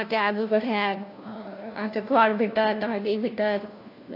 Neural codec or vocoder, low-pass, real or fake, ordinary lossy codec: codec, 16 kHz, 1 kbps, FunCodec, trained on LibriTTS, 50 frames a second; 5.4 kHz; fake; AAC, 32 kbps